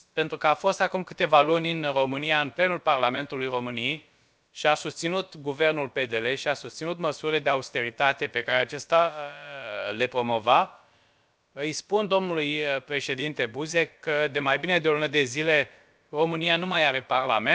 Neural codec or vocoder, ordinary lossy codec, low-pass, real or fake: codec, 16 kHz, about 1 kbps, DyCAST, with the encoder's durations; none; none; fake